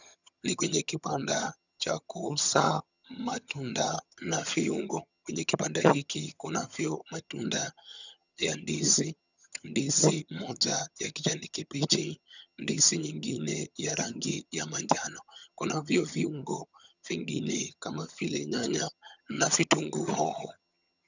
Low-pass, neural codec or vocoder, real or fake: 7.2 kHz; vocoder, 22.05 kHz, 80 mel bands, HiFi-GAN; fake